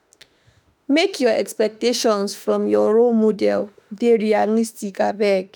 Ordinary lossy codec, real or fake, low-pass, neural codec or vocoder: none; fake; none; autoencoder, 48 kHz, 32 numbers a frame, DAC-VAE, trained on Japanese speech